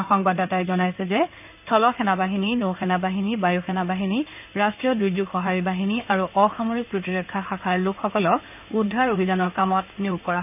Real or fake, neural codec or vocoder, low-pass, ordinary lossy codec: fake; codec, 16 kHz in and 24 kHz out, 2.2 kbps, FireRedTTS-2 codec; 3.6 kHz; none